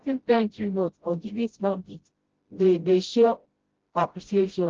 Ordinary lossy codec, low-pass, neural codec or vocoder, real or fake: Opus, 16 kbps; 7.2 kHz; codec, 16 kHz, 0.5 kbps, FreqCodec, smaller model; fake